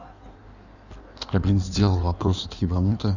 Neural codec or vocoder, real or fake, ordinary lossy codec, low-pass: codec, 16 kHz in and 24 kHz out, 1.1 kbps, FireRedTTS-2 codec; fake; none; 7.2 kHz